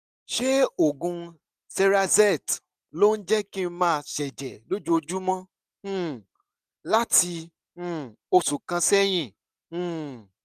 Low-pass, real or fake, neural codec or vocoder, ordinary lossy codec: 14.4 kHz; real; none; none